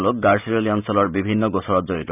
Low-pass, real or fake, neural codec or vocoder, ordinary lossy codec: 3.6 kHz; fake; vocoder, 44.1 kHz, 128 mel bands every 512 samples, BigVGAN v2; none